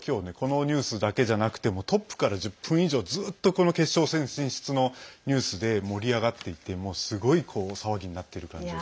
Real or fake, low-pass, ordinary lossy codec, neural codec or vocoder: real; none; none; none